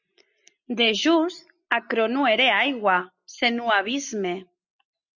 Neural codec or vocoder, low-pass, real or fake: none; 7.2 kHz; real